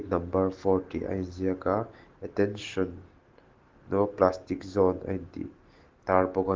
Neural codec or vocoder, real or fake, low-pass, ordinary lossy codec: none; real; 7.2 kHz; Opus, 16 kbps